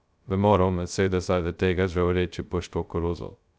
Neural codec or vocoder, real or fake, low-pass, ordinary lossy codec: codec, 16 kHz, 0.2 kbps, FocalCodec; fake; none; none